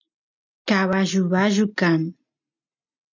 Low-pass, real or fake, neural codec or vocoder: 7.2 kHz; real; none